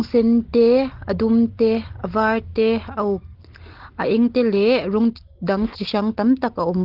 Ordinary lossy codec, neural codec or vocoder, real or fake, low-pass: Opus, 16 kbps; none; real; 5.4 kHz